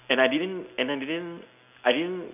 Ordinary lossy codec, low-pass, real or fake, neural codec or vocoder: none; 3.6 kHz; real; none